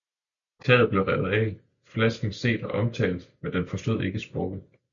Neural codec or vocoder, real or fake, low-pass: none; real; 7.2 kHz